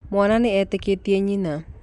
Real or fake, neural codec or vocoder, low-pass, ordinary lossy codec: real; none; 10.8 kHz; none